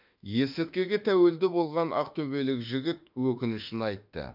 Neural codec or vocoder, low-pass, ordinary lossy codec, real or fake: autoencoder, 48 kHz, 32 numbers a frame, DAC-VAE, trained on Japanese speech; 5.4 kHz; none; fake